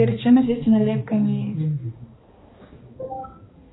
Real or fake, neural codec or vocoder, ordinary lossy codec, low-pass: fake; codec, 16 kHz, 2 kbps, X-Codec, HuBERT features, trained on balanced general audio; AAC, 16 kbps; 7.2 kHz